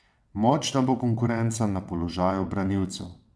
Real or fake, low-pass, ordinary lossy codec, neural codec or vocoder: fake; none; none; vocoder, 22.05 kHz, 80 mel bands, WaveNeXt